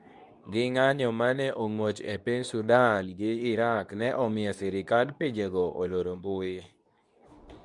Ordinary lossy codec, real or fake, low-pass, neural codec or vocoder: none; fake; 10.8 kHz; codec, 24 kHz, 0.9 kbps, WavTokenizer, medium speech release version 2